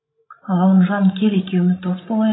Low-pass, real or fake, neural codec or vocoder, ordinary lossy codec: 7.2 kHz; fake; codec, 16 kHz, 16 kbps, FreqCodec, larger model; AAC, 16 kbps